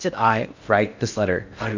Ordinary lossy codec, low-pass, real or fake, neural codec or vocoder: MP3, 64 kbps; 7.2 kHz; fake; codec, 16 kHz in and 24 kHz out, 0.6 kbps, FocalCodec, streaming, 2048 codes